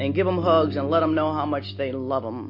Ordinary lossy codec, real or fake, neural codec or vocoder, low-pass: MP3, 32 kbps; real; none; 5.4 kHz